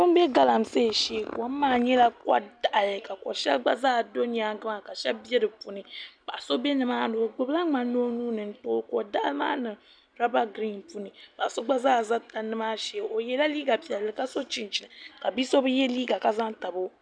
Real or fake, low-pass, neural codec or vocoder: real; 9.9 kHz; none